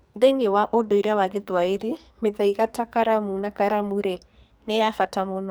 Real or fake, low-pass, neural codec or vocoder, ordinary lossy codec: fake; none; codec, 44.1 kHz, 2.6 kbps, SNAC; none